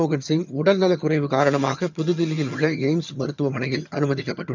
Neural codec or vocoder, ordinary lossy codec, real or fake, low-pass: vocoder, 22.05 kHz, 80 mel bands, HiFi-GAN; none; fake; 7.2 kHz